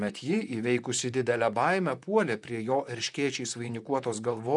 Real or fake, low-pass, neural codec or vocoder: fake; 10.8 kHz; vocoder, 44.1 kHz, 128 mel bands, Pupu-Vocoder